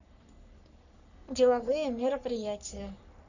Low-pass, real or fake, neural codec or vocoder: 7.2 kHz; fake; codec, 44.1 kHz, 3.4 kbps, Pupu-Codec